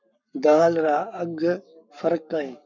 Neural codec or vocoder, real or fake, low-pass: codec, 44.1 kHz, 7.8 kbps, Pupu-Codec; fake; 7.2 kHz